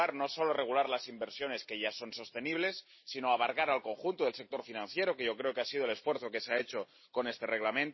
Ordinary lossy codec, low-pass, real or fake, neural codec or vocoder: MP3, 24 kbps; 7.2 kHz; real; none